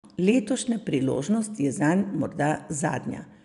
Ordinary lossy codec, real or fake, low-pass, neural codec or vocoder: none; real; 10.8 kHz; none